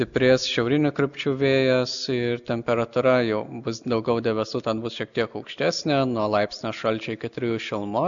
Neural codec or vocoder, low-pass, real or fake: none; 7.2 kHz; real